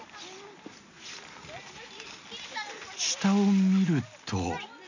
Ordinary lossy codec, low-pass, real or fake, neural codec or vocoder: none; 7.2 kHz; real; none